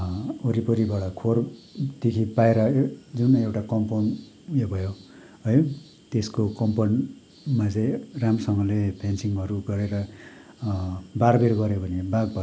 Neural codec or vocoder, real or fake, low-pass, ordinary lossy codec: none; real; none; none